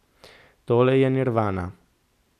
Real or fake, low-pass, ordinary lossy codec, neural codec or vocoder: real; 14.4 kHz; none; none